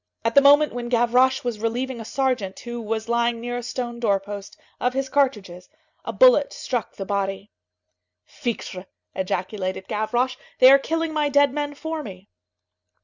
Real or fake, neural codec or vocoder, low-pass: real; none; 7.2 kHz